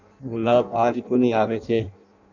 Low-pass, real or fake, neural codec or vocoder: 7.2 kHz; fake; codec, 16 kHz in and 24 kHz out, 0.6 kbps, FireRedTTS-2 codec